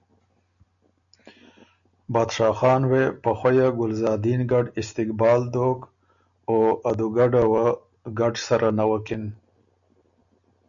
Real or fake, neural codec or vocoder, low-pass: real; none; 7.2 kHz